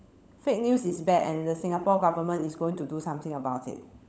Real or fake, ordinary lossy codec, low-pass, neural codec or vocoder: fake; none; none; codec, 16 kHz, 16 kbps, FunCodec, trained on LibriTTS, 50 frames a second